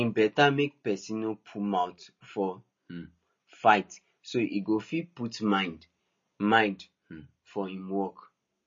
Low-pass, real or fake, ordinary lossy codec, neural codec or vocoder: 7.2 kHz; real; MP3, 32 kbps; none